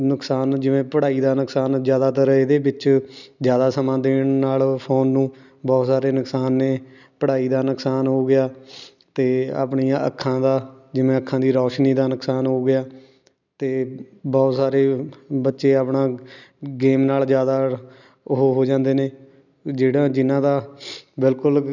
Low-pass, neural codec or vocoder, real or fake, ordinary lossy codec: 7.2 kHz; none; real; none